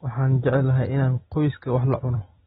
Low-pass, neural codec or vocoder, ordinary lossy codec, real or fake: 10.8 kHz; none; AAC, 16 kbps; real